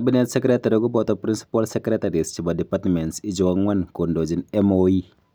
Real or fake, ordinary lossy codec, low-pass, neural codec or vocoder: real; none; none; none